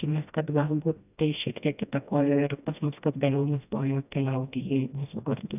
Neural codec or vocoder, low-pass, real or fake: codec, 16 kHz, 1 kbps, FreqCodec, smaller model; 3.6 kHz; fake